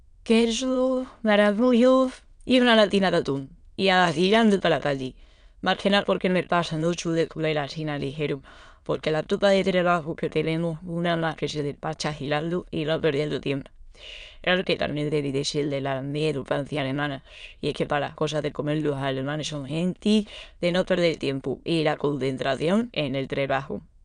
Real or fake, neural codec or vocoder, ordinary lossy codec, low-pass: fake; autoencoder, 22.05 kHz, a latent of 192 numbers a frame, VITS, trained on many speakers; none; 9.9 kHz